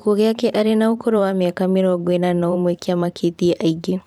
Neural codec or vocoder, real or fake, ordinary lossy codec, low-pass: vocoder, 44.1 kHz, 128 mel bands every 512 samples, BigVGAN v2; fake; none; 19.8 kHz